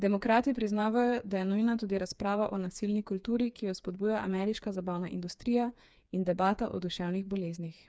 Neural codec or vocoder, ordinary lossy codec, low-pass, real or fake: codec, 16 kHz, 4 kbps, FreqCodec, smaller model; none; none; fake